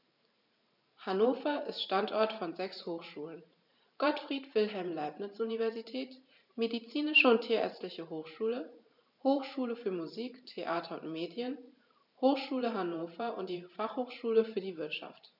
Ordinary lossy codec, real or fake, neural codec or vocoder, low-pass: none; fake; vocoder, 44.1 kHz, 128 mel bands every 512 samples, BigVGAN v2; 5.4 kHz